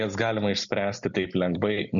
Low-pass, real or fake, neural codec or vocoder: 7.2 kHz; real; none